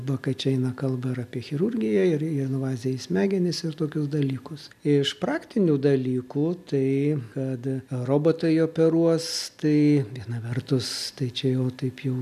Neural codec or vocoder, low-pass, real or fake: none; 14.4 kHz; real